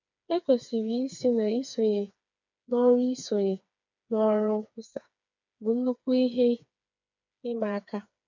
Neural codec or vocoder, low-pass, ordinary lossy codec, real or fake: codec, 16 kHz, 4 kbps, FreqCodec, smaller model; 7.2 kHz; none; fake